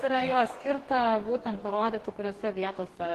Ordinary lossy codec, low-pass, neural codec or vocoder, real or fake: Opus, 16 kbps; 14.4 kHz; codec, 44.1 kHz, 2.6 kbps, DAC; fake